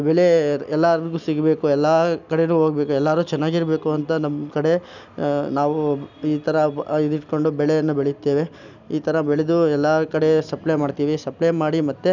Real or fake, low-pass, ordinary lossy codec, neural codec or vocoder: real; 7.2 kHz; none; none